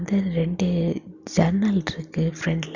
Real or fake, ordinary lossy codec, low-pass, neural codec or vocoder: real; Opus, 64 kbps; 7.2 kHz; none